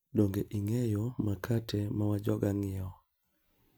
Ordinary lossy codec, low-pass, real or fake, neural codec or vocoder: none; none; real; none